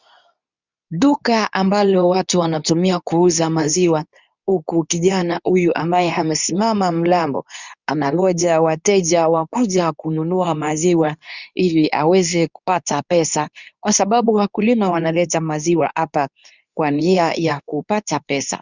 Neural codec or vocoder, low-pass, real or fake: codec, 24 kHz, 0.9 kbps, WavTokenizer, medium speech release version 2; 7.2 kHz; fake